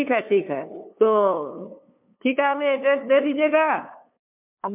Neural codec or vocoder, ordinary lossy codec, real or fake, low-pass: codec, 16 kHz, 2 kbps, FunCodec, trained on LibriTTS, 25 frames a second; MP3, 32 kbps; fake; 3.6 kHz